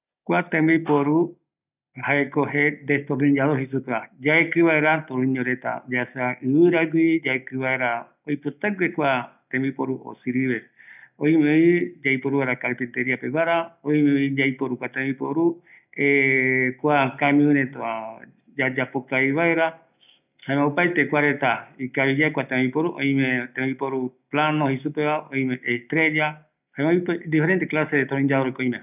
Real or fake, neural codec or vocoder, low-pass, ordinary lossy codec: real; none; 3.6 kHz; none